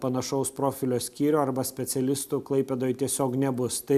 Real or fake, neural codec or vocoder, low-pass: real; none; 14.4 kHz